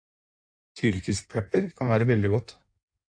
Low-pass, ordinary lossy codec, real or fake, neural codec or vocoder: 9.9 kHz; AAC, 64 kbps; fake; codec, 16 kHz in and 24 kHz out, 1.1 kbps, FireRedTTS-2 codec